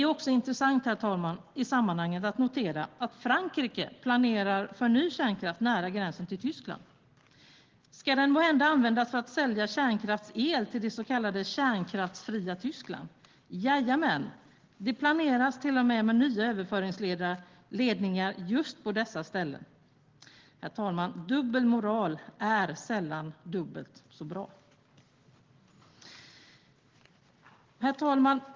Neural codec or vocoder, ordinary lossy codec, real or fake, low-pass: none; Opus, 16 kbps; real; 7.2 kHz